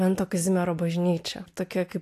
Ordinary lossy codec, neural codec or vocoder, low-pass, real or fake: AAC, 64 kbps; none; 14.4 kHz; real